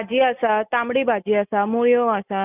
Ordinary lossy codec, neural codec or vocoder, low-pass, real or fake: none; none; 3.6 kHz; real